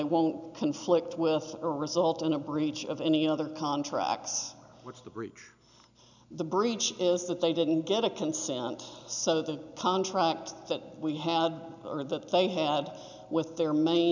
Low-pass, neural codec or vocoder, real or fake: 7.2 kHz; none; real